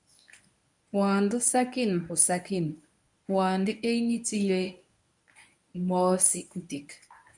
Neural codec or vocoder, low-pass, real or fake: codec, 24 kHz, 0.9 kbps, WavTokenizer, medium speech release version 1; 10.8 kHz; fake